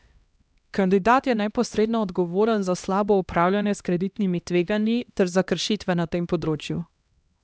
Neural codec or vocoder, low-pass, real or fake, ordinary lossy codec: codec, 16 kHz, 1 kbps, X-Codec, HuBERT features, trained on LibriSpeech; none; fake; none